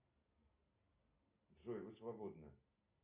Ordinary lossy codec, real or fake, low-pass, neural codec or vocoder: Opus, 64 kbps; real; 3.6 kHz; none